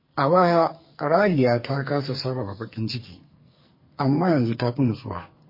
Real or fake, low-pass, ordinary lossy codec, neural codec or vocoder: fake; 5.4 kHz; MP3, 24 kbps; codec, 44.1 kHz, 2.6 kbps, SNAC